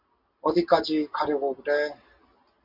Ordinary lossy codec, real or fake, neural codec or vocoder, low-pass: MP3, 48 kbps; real; none; 5.4 kHz